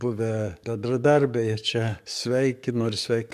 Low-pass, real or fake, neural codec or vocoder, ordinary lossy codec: 14.4 kHz; fake; codec, 44.1 kHz, 7.8 kbps, DAC; MP3, 96 kbps